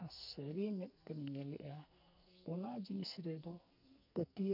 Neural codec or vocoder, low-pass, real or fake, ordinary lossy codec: codec, 44.1 kHz, 2.6 kbps, SNAC; 5.4 kHz; fake; none